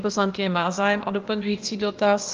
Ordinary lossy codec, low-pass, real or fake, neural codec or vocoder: Opus, 32 kbps; 7.2 kHz; fake; codec, 16 kHz, 0.8 kbps, ZipCodec